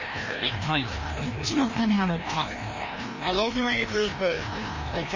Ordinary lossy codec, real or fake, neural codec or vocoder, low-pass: MP3, 32 kbps; fake; codec, 16 kHz, 1 kbps, FreqCodec, larger model; 7.2 kHz